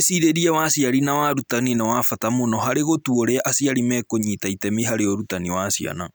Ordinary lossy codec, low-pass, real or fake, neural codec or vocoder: none; none; real; none